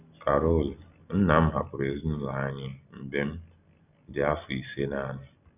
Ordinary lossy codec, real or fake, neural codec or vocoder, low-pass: none; real; none; 3.6 kHz